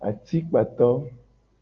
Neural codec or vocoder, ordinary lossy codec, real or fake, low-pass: none; Opus, 24 kbps; real; 7.2 kHz